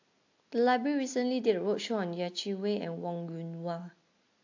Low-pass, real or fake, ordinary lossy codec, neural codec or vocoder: 7.2 kHz; real; AAC, 48 kbps; none